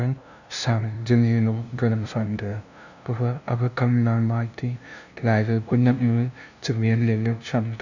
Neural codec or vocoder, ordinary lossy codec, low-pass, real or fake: codec, 16 kHz, 0.5 kbps, FunCodec, trained on LibriTTS, 25 frames a second; none; 7.2 kHz; fake